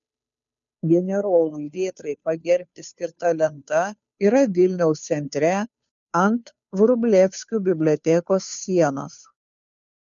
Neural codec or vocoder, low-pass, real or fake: codec, 16 kHz, 2 kbps, FunCodec, trained on Chinese and English, 25 frames a second; 7.2 kHz; fake